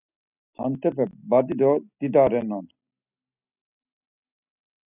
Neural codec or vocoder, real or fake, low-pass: none; real; 3.6 kHz